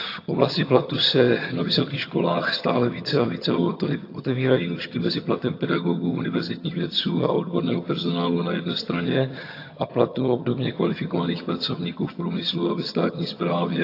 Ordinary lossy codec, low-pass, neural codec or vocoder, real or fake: AAC, 32 kbps; 5.4 kHz; vocoder, 22.05 kHz, 80 mel bands, HiFi-GAN; fake